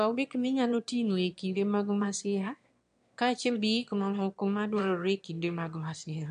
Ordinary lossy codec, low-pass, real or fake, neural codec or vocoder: MP3, 64 kbps; 9.9 kHz; fake; autoencoder, 22.05 kHz, a latent of 192 numbers a frame, VITS, trained on one speaker